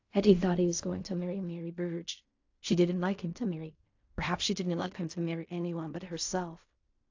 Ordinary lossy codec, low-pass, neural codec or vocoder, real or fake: AAC, 48 kbps; 7.2 kHz; codec, 16 kHz in and 24 kHz out, 0.4 kbps, LongCat-Audio-Codec, fine tuned four codebook decoder; fake